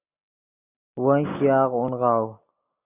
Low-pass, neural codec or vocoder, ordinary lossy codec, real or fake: 3.6 kHz; none; Opus, 64 kbps; real